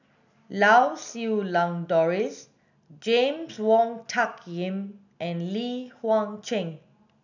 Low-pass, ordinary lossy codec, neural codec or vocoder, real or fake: 7.2 kHz; none; none; real